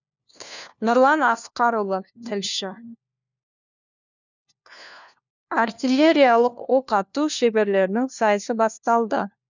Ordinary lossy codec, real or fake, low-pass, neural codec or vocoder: none; fake; 7.2 kHz; codec, 16 kHz, 1 kbps, FunCodec, trained on LibriTTS, 50 frames a second